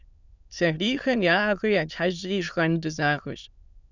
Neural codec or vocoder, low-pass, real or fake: autoencoder, 22.05 kHz, a latent of 192 numbers a frame, VITS, trained on many speakers; 7.2 kHz; fake